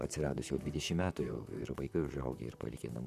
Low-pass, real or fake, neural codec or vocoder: 14.4 kHz; fake; vocoder, 44.1 kHz, 128 mel bands, Pupu-Vocoder